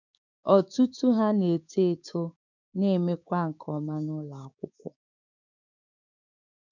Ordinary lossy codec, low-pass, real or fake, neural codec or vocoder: none; 7.2 kHz; fake; codec, 16 kHz, 6 kbps, DAC